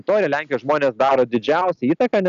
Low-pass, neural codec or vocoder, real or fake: 7.2 kHz; none; real